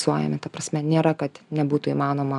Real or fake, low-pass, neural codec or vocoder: real; 10.8 kHz; none